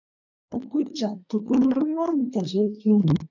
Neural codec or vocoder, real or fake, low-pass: codec, 24 kHz, 1 kbps, SNAC; fake; 7.2 kHz